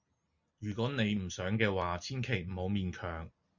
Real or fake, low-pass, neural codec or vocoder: real; 7.2 kHz; none